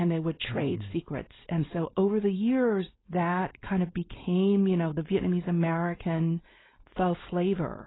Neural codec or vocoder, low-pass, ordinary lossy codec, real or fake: codec, 16 kHz, 4.8 kbps, FACodec; 7.2 kHz; AAC, 16 kbps; fake